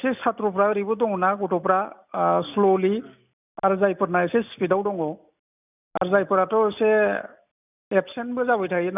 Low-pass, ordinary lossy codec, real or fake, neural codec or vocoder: 3.6 kHz; none; real; none